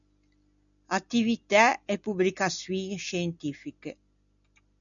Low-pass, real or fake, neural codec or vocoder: 7.2 kHz; real; none